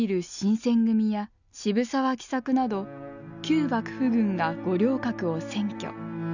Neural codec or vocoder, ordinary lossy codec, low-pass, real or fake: none; none; 7.2 kHz; real